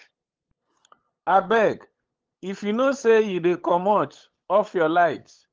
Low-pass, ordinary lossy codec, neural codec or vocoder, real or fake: 7.2 kHz; Opus, 16 kbps; codec, 16 kHz, 8 kbps, FunCodec, trained on LibriTTS, 25 frames a second; fake